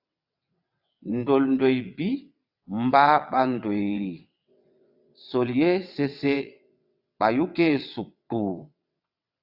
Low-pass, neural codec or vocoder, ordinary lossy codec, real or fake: 5.4 kHz; vocoder, 22.05 kHz, 80 mel bands, WaveNeXt; AAC, 48 kbps; fake